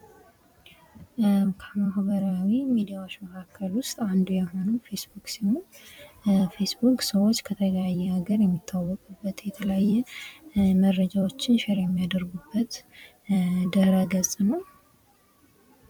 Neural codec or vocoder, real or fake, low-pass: vocoder, 44.1 kHz, 128 mel bands every 256 samples, BigVGAN v2; fake; 19.8 kHz